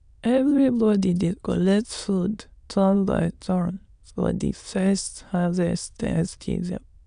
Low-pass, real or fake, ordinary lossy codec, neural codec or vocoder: 9.9 kHz; fake; none; autoencoder, 22.05 kHz, a latent of 192 numbers a frame, VITS, trained on many speakers